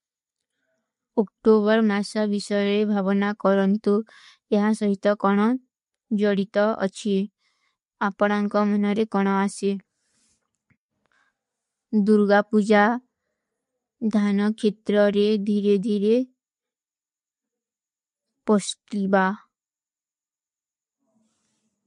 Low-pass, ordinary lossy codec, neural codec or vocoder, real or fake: 14.4 kHz; MP3, 48 kbps; none; real